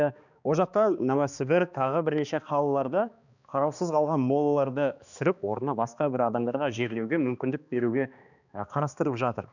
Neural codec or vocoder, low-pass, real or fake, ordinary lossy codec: codec, 16 kHz, 2 kbps, X-Codec, HuBERT features, trained on balanced general audio; 7.2 kHz; fake; none